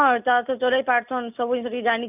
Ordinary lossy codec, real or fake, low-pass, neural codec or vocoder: none; real; 3.6 kHz; none